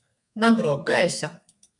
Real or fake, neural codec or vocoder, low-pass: fake; codec, 32 kHz, 1.9 kbps, SNAC; 10.8 kHz